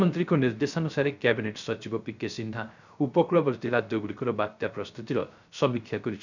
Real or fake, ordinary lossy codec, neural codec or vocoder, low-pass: fake; none; codec, 16 kHz, 0.3 kbps, FocalCodec; 7.2 kHz